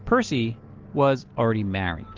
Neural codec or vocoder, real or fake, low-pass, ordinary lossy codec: none; real; 7.2 kHz; Opus, 16 kbps